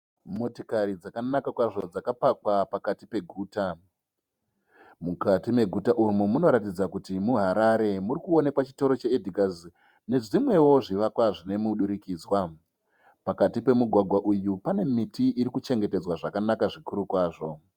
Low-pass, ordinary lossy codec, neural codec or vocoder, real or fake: 19.8 kHz; Opus, 64 kbps; vocoder, 48 kHz, 128 mel bands, Vocos; fake